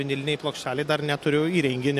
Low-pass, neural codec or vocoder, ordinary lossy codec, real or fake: 14.4 kHz; none; AAC, 64 kbps; real